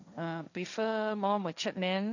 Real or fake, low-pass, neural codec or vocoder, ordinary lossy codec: fake; 7.2 kHz; codec, 16 kHz, 1.1 kbps, Voila-Tokenizer; none